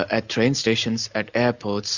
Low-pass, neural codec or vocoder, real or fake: 7.2 kHz; none; real